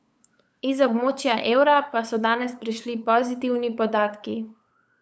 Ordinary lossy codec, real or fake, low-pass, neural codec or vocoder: none; fake; none; codec, 16 kHz, 8 kbps, FunCodec, trained on LibriTTS, 25 frames a second